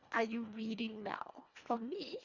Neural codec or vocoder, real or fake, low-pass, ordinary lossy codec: codec, 24 kHz, 1.5 kbps, HILCodec; fake; 7.2 kHz; Opus, 64 kbps